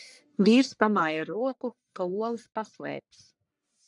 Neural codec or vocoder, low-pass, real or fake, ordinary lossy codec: codec, 44.1 kHz, 1.7 kbps, Pupu-Codec; 10.8 kHz; fake; MP3, 96 kbps